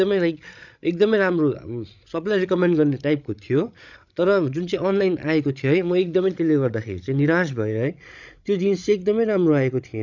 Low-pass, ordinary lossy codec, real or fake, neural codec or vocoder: 7.2 kHz; none; fake; codec, 16 kHz, 16 kbps, FreqCodec, larger model